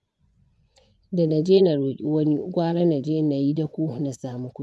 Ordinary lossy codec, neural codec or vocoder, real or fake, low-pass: none; vocoder, 24 kHz, 100 mel bands, Vocos; fake; none